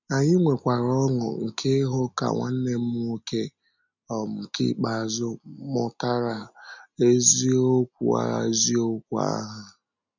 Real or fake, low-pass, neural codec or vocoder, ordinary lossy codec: real; 7.2 kHz; none; none